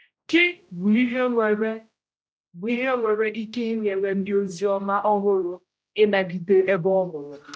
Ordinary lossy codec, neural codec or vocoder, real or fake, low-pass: none; codec, 16 kHz, 0.5 kbps, X-Codec, HuBERT features, trained on general audio; fake; none